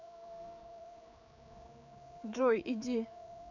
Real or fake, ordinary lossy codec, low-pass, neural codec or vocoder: fake; none; 7.2 kHz; codec, 16 kHz, 4 kbps, X-Codec, HuBERT features, trained on general audio